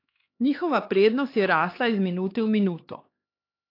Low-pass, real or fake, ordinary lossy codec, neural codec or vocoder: 5.4 kHz; fake; AAC, 32 kbps; codec, 16 kHz, 2 kbps, X-Codec, HuBERT features, trained on LibriSpeech